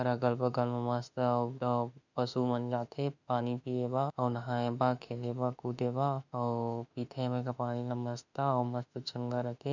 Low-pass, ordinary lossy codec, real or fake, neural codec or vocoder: 7.2 kHz; none; fake; autoencoder, 48 kHz, 32 numbers a frame, DAC-VAE, trained on Japanese speech